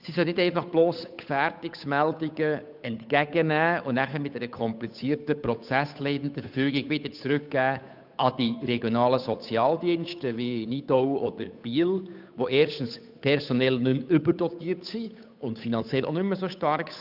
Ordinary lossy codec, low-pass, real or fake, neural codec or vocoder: none; 5.4 kHz; fake; codec, 16 kHz, 8 kbps, FunCodec, trained on Chinese and English, 25 frames a second